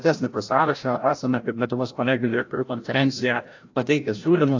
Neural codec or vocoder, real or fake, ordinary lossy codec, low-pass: codec, 16 kHz, 0.5 kbps, FreqCodec, larger model; fake; AAC, 48 kbps; 7.2 kHz